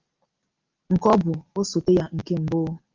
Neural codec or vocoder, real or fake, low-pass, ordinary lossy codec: none; real; 7.2 kHz; Opus, 32 kbps